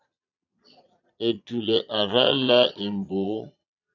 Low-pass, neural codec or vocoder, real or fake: 7.2 kHz; vocoder, 22.05 kHz, 80 mel bands, Vocos; fake